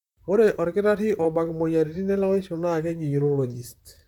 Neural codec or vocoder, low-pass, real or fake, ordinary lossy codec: vocoder, 44.1 kHz, 128 mel bands, Pupu-Vocoder; 19.8 kHz; fake; none